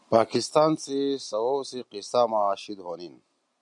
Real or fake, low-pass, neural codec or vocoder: real; 10.8 kHz; none